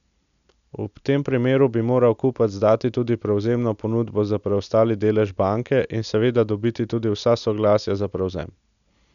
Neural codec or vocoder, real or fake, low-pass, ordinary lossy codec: none; real; 7.2 kHz; none